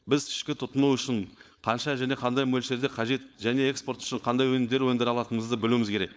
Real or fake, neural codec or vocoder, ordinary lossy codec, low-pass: fake; codec, 16 kHz, 4.8 kbps, FACodec; none; none